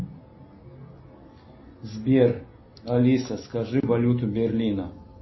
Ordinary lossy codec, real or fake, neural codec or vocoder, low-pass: MP3, 24 kbps; real; none; 7.2 kHz